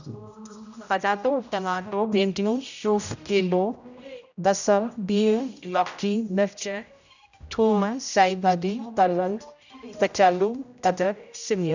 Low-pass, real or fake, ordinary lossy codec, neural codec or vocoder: 7.2 kHz; fake; none; codec, 16 kHz, 0.5 kbps, X-Codec, HuBERT features, trained on general audio